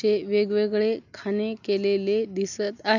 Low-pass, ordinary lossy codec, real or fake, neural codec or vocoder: 7.2 kHz; none; real; none